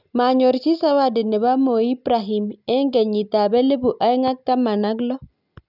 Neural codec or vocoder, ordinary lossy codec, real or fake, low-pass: none; none; real; 5.4 kHz